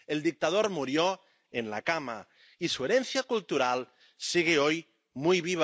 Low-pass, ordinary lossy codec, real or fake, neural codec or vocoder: none; none; real; none